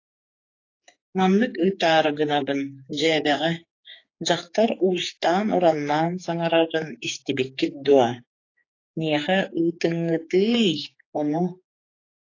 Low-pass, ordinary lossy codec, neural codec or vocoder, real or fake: 7.2 kHz; AAC, 32 kbps; codec, 16 kHz, 4 kbps, X-Codec, HuBERT features, trained on general audio; fake